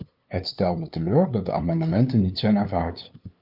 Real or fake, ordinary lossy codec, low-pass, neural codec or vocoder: fake; Opus, 24 kbps; 5.4 kHz; codec, 16 kHz, 2 kbps, FunCodec, trained on LibriTTS, 25 frames a second